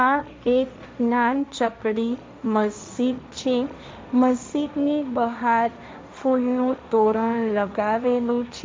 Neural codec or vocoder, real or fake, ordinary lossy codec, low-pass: codec, 16 kHz, 1.1 kbps, Voila-Tokenizer; fake; none; none